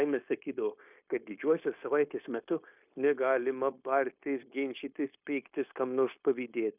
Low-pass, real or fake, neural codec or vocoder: 3.6 kHz; fake; codec, 16 kHz, 0.9 kbps, LongCat-Audio-Codec